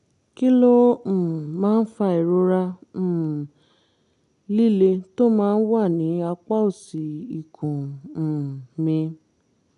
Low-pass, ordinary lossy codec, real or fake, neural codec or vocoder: 10.8 kHz; none; real; none